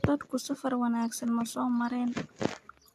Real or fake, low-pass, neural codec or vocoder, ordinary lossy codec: real; 14.4 kHz; none; AAC, 96 kbps